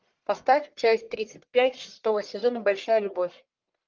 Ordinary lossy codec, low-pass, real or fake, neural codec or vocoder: Opus, 24 kbps; 7.2 kHz; fake; codec, 44.1 kHz, 1.7 kbps, Pupu-Codec